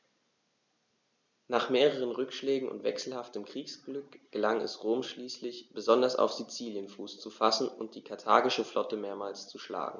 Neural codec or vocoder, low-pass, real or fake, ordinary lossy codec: none; 7.2 kHz; real; none